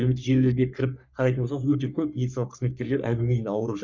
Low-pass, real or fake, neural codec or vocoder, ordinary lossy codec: 7.2 kHz; fake; codec, 44.1 kHz, 3.4 kbps, Pupu-Codec; none